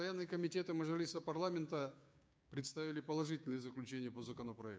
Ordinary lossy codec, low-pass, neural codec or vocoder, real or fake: none; none; codec, 16 kHz, 6 kbps, DAC; fake